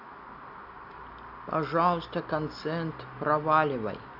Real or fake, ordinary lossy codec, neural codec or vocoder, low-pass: real; AAC, 48 kbps; none; 5.4 kHz